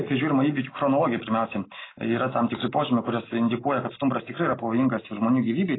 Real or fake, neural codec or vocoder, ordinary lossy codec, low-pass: real; none; AAC, 16 kbps; 7.2 kHz